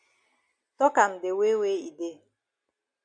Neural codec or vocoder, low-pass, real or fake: none; 9.9 kHz; real